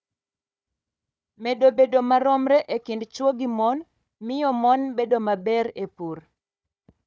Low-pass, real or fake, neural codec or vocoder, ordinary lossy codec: none; fake; codec, 16 kHz, 4 kbps, FunCodec, trained on Chinese and English, 50 frames a second; none